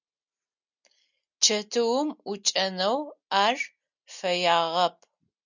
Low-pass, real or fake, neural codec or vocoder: 7.2 kHz; real; none